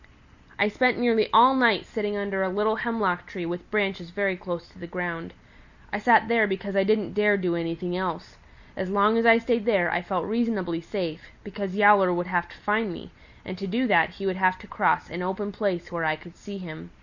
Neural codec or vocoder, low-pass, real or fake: none; 7.2 kHz; real